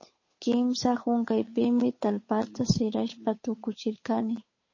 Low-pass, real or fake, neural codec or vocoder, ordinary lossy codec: 7.2 kHz; fake; codec, 16 kHz, 8 kbps, FunCodec, trained on Chinese and English, 25 frames a second; MP3, 32 kbps